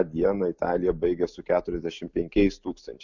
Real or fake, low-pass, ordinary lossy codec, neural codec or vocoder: real; 7.2 kHz; MP3, 64 kbps; none